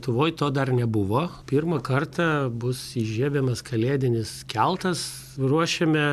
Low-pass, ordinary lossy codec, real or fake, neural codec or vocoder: 14.4 kHz; AAC, 96 kbps; real; none